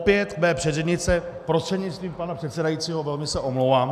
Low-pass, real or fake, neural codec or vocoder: 14.4 kHz; real; none